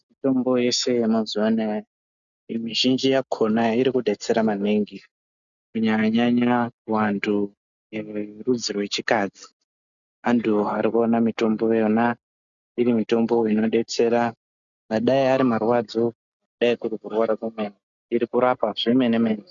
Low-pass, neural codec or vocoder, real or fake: 7.2 kHz; none; real